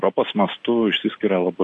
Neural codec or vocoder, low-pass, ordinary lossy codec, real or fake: none; 10.8 kHz; AAC, 48 kbps; real